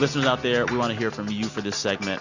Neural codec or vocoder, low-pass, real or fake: none; 7.2 kHz; real